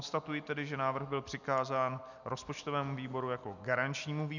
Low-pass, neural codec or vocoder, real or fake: 7.2 kHz; none; real